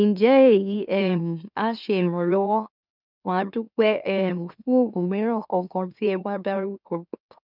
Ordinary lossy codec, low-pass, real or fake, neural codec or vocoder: none; 5.4 kHz; fake; autoencoder, 44.1 kHz, a latent of 192 numbers a frame, MeloTTS